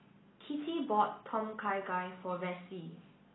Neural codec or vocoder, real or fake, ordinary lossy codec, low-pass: none; real; AAC, 16 kbps; 7.2 kHz